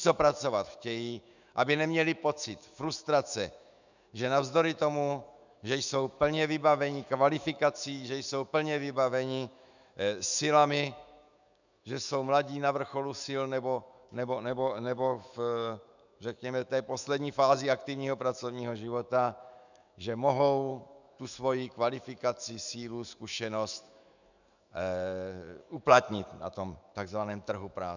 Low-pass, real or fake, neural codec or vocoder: 7.2 kHz; fake; autoencoder, 48 kHz, 128 numbers a frame, DAC-VAE, trained on Japanese speech